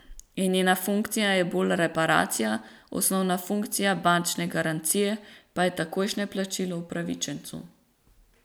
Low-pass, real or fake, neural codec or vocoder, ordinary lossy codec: none; real; none; none